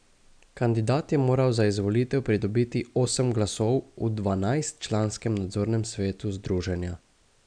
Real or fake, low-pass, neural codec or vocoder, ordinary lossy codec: real; 9.9 kHz; none; none